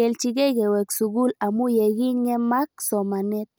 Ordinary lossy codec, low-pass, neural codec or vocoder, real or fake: none; none; none; real